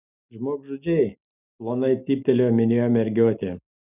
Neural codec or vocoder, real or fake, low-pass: none; real; 3.6 kHz